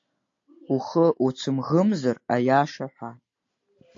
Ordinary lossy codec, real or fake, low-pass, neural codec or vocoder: AAC, 48 kbps; real; 7.2 kHz; none